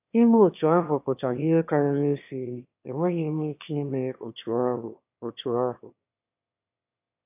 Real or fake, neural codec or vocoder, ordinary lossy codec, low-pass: fake; autoencoder, 22.05 kHz, a latent of 192 numbers a frame, VITS, trained on one speaker; AAC, 32 kbps; 3.6 kHz